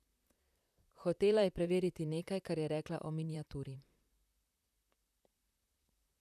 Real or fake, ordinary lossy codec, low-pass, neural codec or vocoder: fake; none; 14.4 kHz; vocoder, 44.1 kHz, 128 mel bands, Pupu-Vocoder